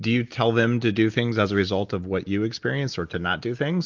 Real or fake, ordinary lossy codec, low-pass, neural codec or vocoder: real; Opus, 24 kbps; 7.2 kHz; none